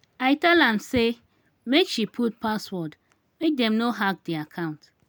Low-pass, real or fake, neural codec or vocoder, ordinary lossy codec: none; real; none; none